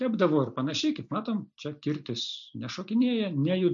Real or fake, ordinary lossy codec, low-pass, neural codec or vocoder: real; MP3, 64 kbps; 7.2 kHz; none